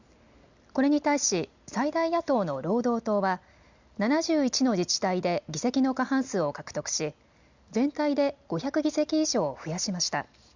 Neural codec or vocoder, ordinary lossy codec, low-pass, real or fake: none; Opus, 64 kbps; 7.2 kHz; real